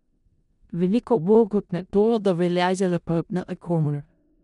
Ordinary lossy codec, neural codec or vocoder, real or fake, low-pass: none; codec, 16 kHz in and 24 kHz out, 0.4 kbps, LongCat-Audio-Codec, four codebook decoder; fake; 10.8 kHz